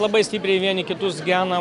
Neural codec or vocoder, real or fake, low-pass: none; real; 10.8 kHz